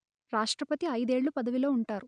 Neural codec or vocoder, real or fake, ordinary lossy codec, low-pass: none; real; none; 9.9 kHz